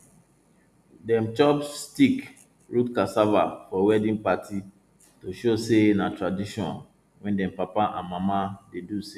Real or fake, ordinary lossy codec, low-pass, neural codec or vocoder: real; none; 14.4 kHz; none